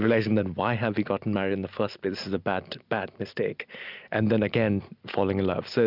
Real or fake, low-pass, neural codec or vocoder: real; 5.4 kHz; none